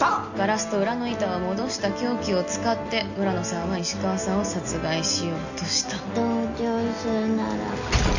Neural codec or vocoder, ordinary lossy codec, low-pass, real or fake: none; none; 7.2 kHz; real